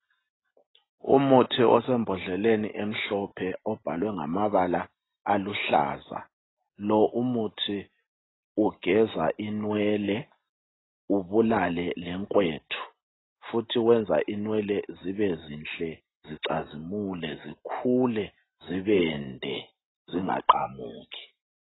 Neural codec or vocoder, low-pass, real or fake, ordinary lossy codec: none; 7.2 kHz; real; AAC, 16 kbps